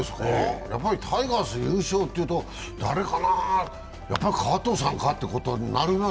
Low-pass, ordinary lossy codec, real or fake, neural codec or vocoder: none; none; real; none